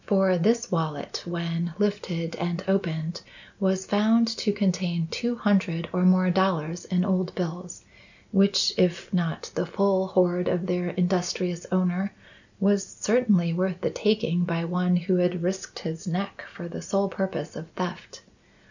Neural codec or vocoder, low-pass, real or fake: none; 7.2 kHz; real